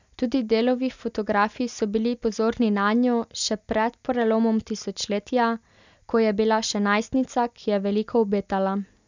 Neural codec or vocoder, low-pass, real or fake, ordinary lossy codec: none; 7.2 kHz; real; none